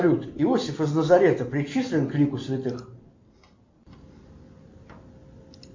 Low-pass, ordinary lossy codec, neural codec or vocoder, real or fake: 7.2 kHz; AAC, 48 kbps; none; real